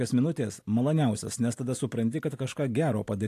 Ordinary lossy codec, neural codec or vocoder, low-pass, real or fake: AAC, 64 kbps; none; 14.4 kHz; real